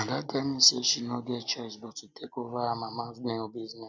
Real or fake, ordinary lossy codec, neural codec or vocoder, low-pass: real; none; none; none